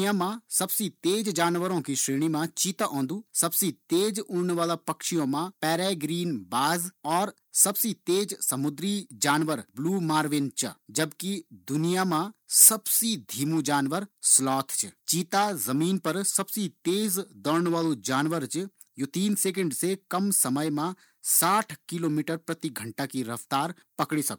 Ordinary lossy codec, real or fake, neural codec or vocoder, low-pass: none; real; none; none